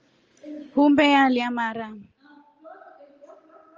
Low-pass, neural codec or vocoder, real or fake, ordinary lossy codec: 7.2 kHz; none; real; Opus, 24 kbps